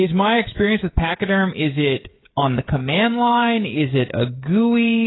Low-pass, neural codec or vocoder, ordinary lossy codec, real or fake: 7.2 kHz; codec, 16 kHz, 16 kbps, FreqCodec, smaller model; AAC, 16 kbps; fake